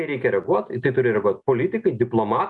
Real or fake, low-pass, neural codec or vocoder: real; 10.8 kHz; none